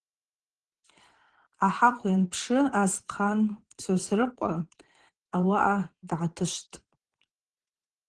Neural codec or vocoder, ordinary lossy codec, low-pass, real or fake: vocoder, 22.05 kHz, 80 mel bands, WaveNeXt; Opus, 16 kbps; 9.9 kHz; fake